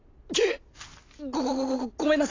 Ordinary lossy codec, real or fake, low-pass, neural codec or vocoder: AAC, 32 kbps; real; 7.2 kHz; none